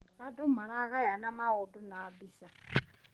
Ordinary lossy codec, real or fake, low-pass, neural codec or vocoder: Opus, 24 kbps; fake; 19.8 kHz; codec, 44.1 kHz, 7.8 kbps, DAC